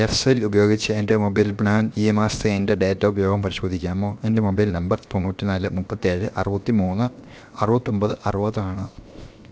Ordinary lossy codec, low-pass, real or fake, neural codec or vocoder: none; none; fake; codec, 16 kHz, 0.7 kbps, FocalCodec